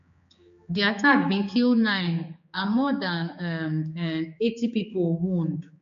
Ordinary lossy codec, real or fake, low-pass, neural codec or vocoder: MP3, 48 kbps; fake; 7.2 kHz; codec, 16 kHz, 4 kbps, X-Codec, HuBERT features, trained on general audio